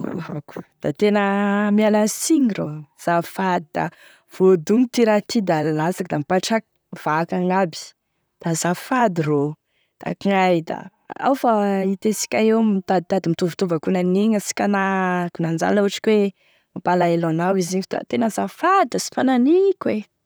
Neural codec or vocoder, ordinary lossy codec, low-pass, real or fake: vocoder, 44.1 kHz, 128 mel bands, Pupu-Vocoder; none; none; fake